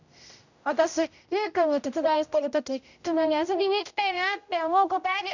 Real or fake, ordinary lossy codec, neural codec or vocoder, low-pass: fake; none; codec, 16 kHz, 0.5 kbps, X-Codec, HuBERT features, trained on general audio; 7.2 kHz